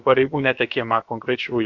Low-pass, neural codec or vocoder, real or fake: 7.2 kHz; codec, 16 kHz, about 1 kbps, DyCAST, with the encoder's durations; fake